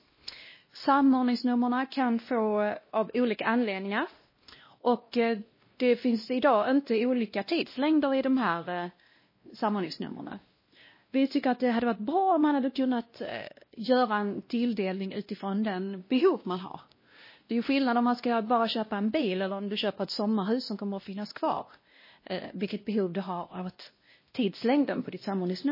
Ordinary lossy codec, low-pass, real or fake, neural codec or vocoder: MP3, 24 kbps; 5.4 kHz; fake; codec, 16 kHz, 1 kbps, X-Codec, WavLM features, trained on Multilingual LibriSpeech